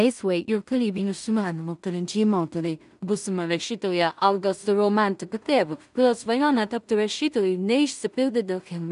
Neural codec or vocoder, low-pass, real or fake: codec, 16 kHz in and 24 kHz out, 0.4 kbps, LongCat-Audio-Codec, two codebook decoder; 10.8 kHz; fake